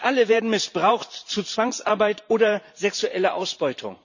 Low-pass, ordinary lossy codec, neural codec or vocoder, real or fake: 7.2 kHz; none; vocoder, 44.1 kHz, 128 mel bands every 512 samples, BigVGAN v2; fake